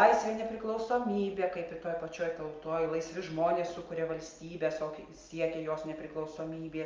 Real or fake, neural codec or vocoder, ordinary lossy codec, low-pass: real; none; Opus, 24 kbps; 7.2 kHz